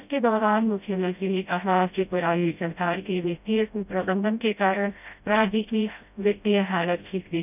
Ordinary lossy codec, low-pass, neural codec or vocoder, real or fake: none; 3.6 kHz; codec, 16 kHz, 0.5 kbps, FreqCodec, smaller model; fake